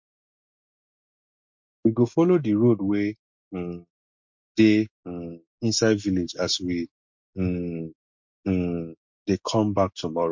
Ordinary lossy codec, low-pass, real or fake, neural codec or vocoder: MP3, 48 kbps; 7.2 kHz; real; none